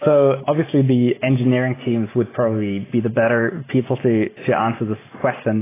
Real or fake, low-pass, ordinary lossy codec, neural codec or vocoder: real; 3.6 kHz; AAC, 16 kbps; none